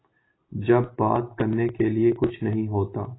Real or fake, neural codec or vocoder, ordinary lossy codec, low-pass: real; none; AAC, 16 kbps; 7.2 kHz